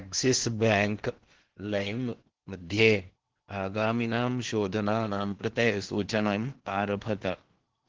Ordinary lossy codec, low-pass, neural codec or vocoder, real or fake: Opus, 16 kbps; 7.2 kHz; codec, 16 kHz in and 24 kHz out, 0.8 kbps, FocalCodec, streaming, 65536 codes; fake